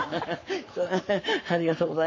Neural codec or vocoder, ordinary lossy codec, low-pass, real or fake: codec, 44.1 kHz, 7.8 kbps, Pupu-Codec; MP3, 32 kbps; 7.2 kHz; fake